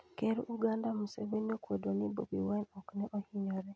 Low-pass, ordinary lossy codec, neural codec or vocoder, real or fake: none; none; none; real